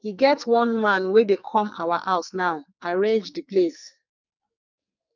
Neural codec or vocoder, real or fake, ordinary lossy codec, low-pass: codec, 44.1 kHz, 2.6 kbps, SNAC; fake; none; 7.2 kHz